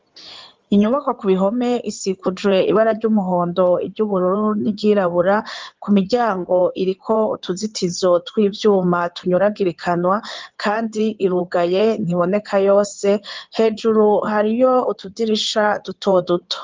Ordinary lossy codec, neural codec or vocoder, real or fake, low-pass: Opus, 32 kbps; codec, 16 kHz in and 24 kHz out, 2.2 kbps, FireRedTTS-2 codec; fake; 7.2 kHz